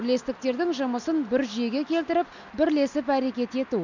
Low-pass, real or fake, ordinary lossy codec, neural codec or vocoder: 7.2 kHz; real; none; none